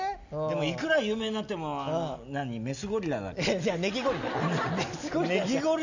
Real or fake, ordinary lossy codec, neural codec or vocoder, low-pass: real; none; none; 7.2 kHz